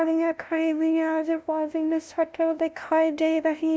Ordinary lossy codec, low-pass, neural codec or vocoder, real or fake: none; none; codec, 16 kHz, 0.5 kbps, FunCodec, trained on LibriTTS, 25 frames a second; fake